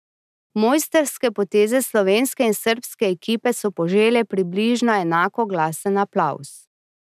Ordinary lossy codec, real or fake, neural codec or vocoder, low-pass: none; real; none; 14.4 kHz